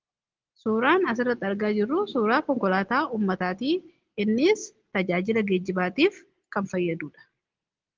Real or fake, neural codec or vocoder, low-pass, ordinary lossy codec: real; none; 7.2 kHz; Opus, 16 kbps